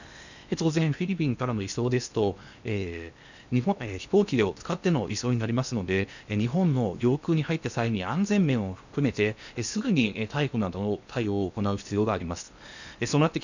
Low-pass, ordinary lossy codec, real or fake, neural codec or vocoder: 7.2 kHz; none; fake; codec, 16 kHz in and 24 kHz out, 0.8 kbps, FocalCodec, streaming, 65536 codes